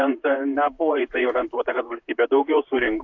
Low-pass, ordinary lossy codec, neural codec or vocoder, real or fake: 7.2 kHz; AAC, 48 kbps; vocoder, 44.1 kHz, 128 mel bands, Pupu-Vocoder; fake